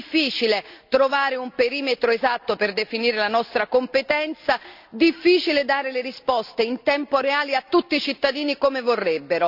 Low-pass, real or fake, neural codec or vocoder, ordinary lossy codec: 5.4 kHz; real; none; none